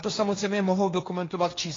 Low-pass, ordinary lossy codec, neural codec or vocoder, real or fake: 7.2 kHz; AAC, 32 kbps; codec, 16 kHz, 1.1 kbps, Voila-Tokenizer; fake